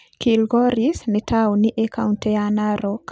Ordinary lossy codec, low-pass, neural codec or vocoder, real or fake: none; none; none; real